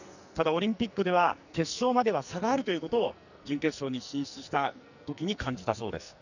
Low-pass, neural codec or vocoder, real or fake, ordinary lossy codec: 7.2 kHz; codec, 44.1 kHz, 2.6 kbps, SNAC; fake; none